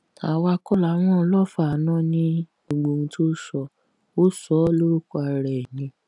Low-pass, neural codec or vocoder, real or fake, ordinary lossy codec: 10.8 kHz; none; real; none